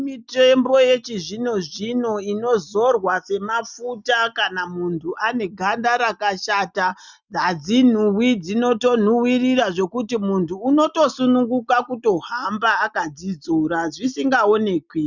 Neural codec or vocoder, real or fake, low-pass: none; real; 7.2 kHz